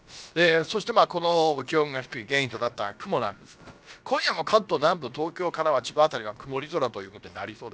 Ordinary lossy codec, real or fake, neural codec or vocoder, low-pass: none; fake; codec, 16 kHz, about 1 kbps, DyCAST, with the encoder's durations; none